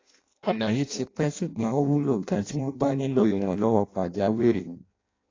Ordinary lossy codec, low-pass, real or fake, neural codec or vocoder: AAC, 32 kbps; 7.2 kHz; fake; codec, 16 kHz in and 24 kHz out, 0.6 kbps, FireRedTTS-2 codec